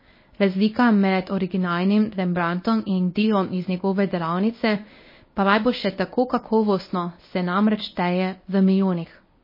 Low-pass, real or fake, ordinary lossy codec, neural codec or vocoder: 5.4 kHz; fake; MP3, 24 kbps; codec, 24 kHz, 0.9 kbps, WavTokenizer, medium speech release version 1